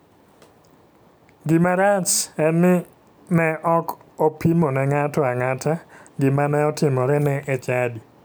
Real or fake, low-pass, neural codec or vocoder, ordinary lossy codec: real; none; none; none